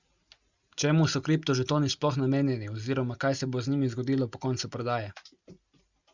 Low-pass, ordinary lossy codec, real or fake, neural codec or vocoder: 7.2 kHz; Opus, 64 kbps; real; none